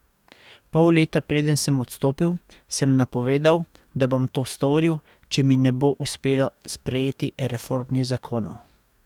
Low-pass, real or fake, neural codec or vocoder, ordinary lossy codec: 19.8 kHz; fake; codec, 44.1 kHz, 2.6 kbps, DAC; none